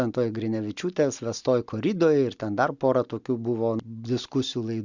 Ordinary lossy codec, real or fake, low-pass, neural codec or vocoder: Opus, 64 kbps; real; 7.2 kHz; none